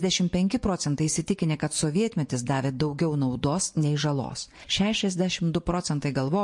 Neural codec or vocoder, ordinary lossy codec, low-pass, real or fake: vocoder, 24 kHz, 100 mel bands, Vocos; MP3, 48 kbps; 10.8 kHz; fake